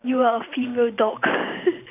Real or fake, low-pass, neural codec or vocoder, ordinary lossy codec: fake; 3.6 kHz; vocoder, 44.1 kHz, 128 mel bands every 512 samples, BigVGAN v2; none